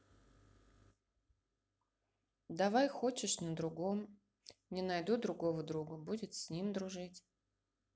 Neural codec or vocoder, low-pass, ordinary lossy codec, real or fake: none; none; none; real